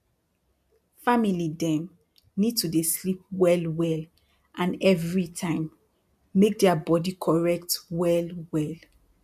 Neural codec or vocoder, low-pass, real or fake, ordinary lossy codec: none; 14.4 kHz; real; MP3, 96 kbps